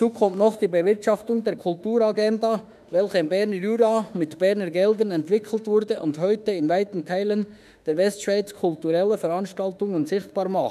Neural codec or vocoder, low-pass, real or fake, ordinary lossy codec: autoencoder, 48 kHz, 32 numbers a frame, DAC-VAE, trained on Japanese speech; 14.4 kHz; fake; none